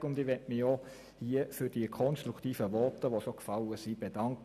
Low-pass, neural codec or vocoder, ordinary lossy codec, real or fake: 14.4 kHz; none; none; real